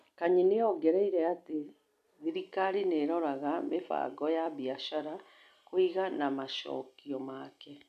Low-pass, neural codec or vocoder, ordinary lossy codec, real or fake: 14.4 kHz; none; none; real